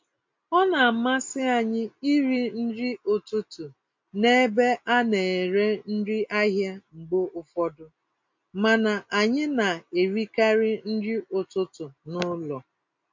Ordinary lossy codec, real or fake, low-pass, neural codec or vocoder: MP3, 48 kbps; real; 7.2 kHz; none